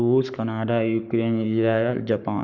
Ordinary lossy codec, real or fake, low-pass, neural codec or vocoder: none; fake; 7.2 kHz; codec, 44.1 kHz, 7.8 kbps, Pupu-Codec